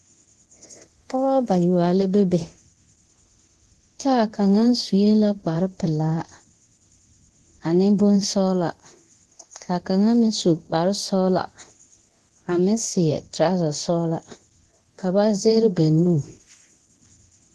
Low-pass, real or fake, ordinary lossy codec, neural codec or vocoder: 10.8 kHz; fake; Opus, 16 kbps; codec, 24 kHz, 0.9 kbps, DualCodec